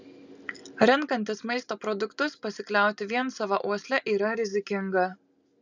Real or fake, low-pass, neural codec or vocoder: real; 7.2 kHz; none